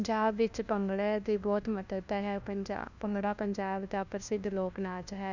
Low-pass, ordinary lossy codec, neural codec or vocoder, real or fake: 7.2 kHz; none; codec, 16 kHz, 1 kbps, FunCodec, trained on LibriTTS, 50 frames a second; fake